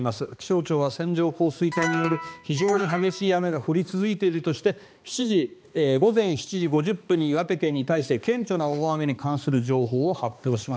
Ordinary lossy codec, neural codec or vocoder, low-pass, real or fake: none; codec, 16 kHz, 2 kbps, X-Codec, HuBERT features, trained on balanced general audio; none; fake